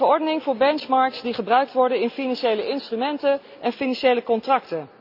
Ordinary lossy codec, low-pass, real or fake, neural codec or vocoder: none; 5.4 kHz; real; none